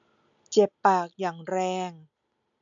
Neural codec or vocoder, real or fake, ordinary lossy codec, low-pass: none; real; none; 7.2 kHz